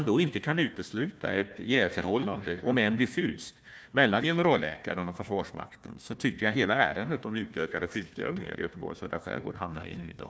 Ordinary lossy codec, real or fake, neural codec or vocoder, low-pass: none; fake; codec, 16 kHz, 1 kbps, FunCodec, trained on Chinese and English, 50 frames a second; none